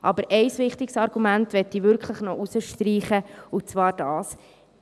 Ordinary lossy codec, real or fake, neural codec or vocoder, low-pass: none; real; none; none